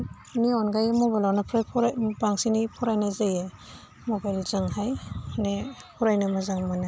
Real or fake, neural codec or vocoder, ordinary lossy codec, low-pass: real; none; none; none